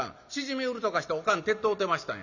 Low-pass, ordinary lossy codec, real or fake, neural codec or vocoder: 7.2 kHz; none; real; none